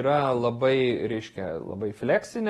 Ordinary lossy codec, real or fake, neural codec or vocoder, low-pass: AAC, 32 kbps; real; none; 19.8 kHz